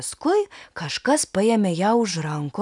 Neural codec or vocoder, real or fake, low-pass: none; real; 10.8 kHz